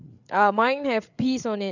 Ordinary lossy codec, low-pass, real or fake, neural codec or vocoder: none; 7.2 kHz; fake; codec, 16 kHz, 8 kbps, FreqCodec, larger model